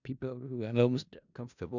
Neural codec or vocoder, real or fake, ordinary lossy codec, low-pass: codec, 16 kHz in and 24 kHz out, 0.4 kbps, LongCat-Audio-Codec, four codebook decoder; fake; none; 7.2 kHz